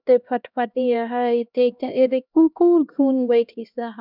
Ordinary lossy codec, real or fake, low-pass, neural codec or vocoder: none; fake; 5.4 kHz; codec, 16 kHz, 1 kbps, X-Codec, HuBERT features, trained on LibriSpeech